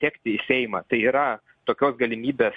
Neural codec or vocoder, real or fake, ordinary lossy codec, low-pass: none; real; MP3, 48 kbps; 9.9 kHz